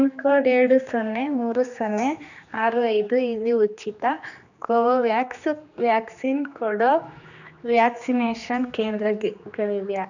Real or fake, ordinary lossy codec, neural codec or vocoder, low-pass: fake; none; codec, 16 kHz, 2 kbps, X-Codec, HuBERT features, trained on general audio; 7.2 kHz